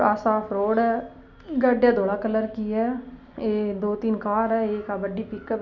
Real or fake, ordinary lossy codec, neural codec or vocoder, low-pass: real; none; none; 7.2 kHz